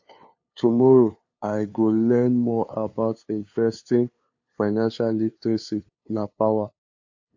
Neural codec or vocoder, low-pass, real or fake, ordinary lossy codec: codec, 16 kHz, 2 kbps, FunCodec, trained on LibriTTS, 25 frames a second; 7.2 kHz; fake; AAC, 48 kbps